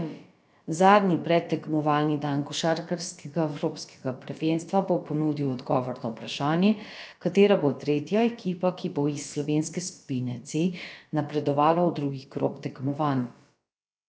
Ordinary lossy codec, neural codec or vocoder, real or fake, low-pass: none; codec, 16 kHz, about 1 kbps, DyCAST, with the encoder's durations; fake; none